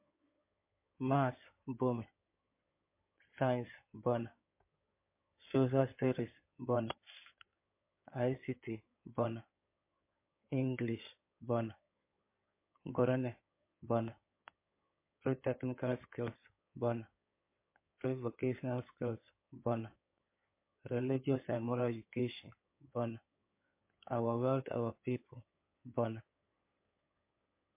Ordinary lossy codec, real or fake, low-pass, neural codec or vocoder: MP3, 24 kbps; fake; 3.6 kHz; codec, 16 kHz in and 24 kHz out, 2.2 kbps, FireRedTTS-2 codec